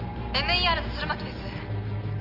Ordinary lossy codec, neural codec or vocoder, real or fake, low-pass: Opus, 24 kbps; none; real; 5.4 kHz